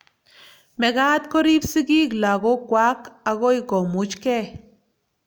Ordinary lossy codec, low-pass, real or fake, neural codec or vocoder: none; none; real; none